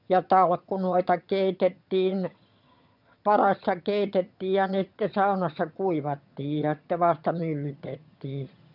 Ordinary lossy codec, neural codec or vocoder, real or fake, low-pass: none; vocoder, 22.05 kHz, 80 mel bands, HiFi-GAN; fake; 5.4 kHz